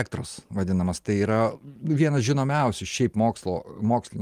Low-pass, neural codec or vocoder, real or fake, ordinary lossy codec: 14.4 kHz; none; real; Opus, 32 kbps